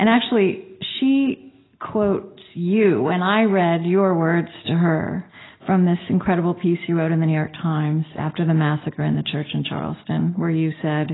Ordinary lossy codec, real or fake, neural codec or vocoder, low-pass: AAC, 16 kbps; real; none; 7.2 kHz